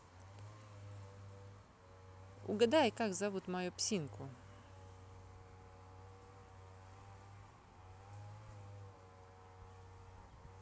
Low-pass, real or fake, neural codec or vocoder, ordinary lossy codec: none; real; none; none